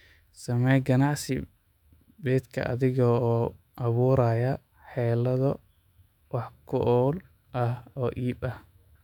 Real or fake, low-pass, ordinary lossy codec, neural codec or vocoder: fake; 19.8 kHz; none; autoencoder, 48 kHz, 128 numbers a frame, DAC-VAE, trained on Japanese speech